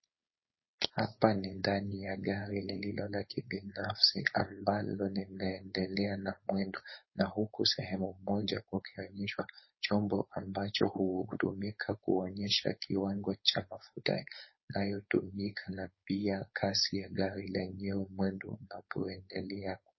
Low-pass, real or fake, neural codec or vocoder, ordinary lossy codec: 7.2 kHz; fake; codec, 16 kHz, 4.8 kbps, FACodec; MP3, 24 kbps